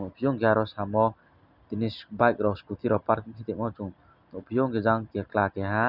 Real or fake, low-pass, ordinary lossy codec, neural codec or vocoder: real; 5.4 kHz; none; none